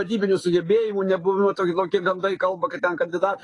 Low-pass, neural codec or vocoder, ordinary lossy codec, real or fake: 10.8 kHz; codec, 44.1 kHz, 7.8 kbps, DAC; AAC, 32 kbps; fake